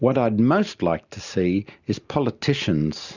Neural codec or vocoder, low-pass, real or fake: none; 7.2 kHz; real